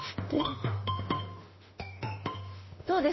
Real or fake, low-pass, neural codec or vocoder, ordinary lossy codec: fake; 7.2 kHz; autoencoder, 48 kHz, 32 numbers a frame, DAC-VAE, trained on Japanese speech; MP3, 24 kbps